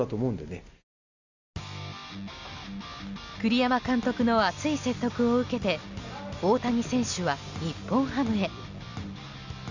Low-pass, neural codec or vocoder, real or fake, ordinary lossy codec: 7.2 kHz; none; real; Opus, 64 kbps